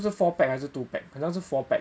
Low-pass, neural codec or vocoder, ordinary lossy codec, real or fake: none; none; none; real